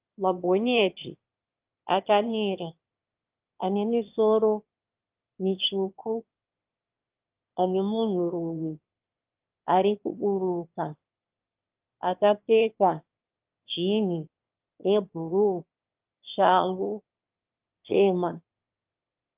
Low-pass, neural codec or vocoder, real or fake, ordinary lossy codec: 3.6 kHz; autoencoder, 22.05 kHz, a latent of 192 numbers a frame, VITS, trained on one speaker; fake; Opus, 24 kbps